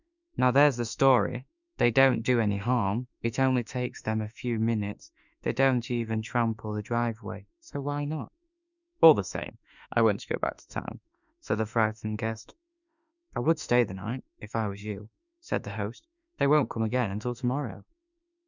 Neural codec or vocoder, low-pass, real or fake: autoencoder, 48 kHz, 32 numbers a frame, DAC-VAE, trained on Japanese speech; 7.2 kHz; fake